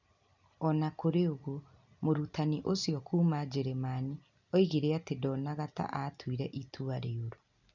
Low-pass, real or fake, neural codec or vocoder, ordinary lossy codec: 7.2 kHz; real; none; none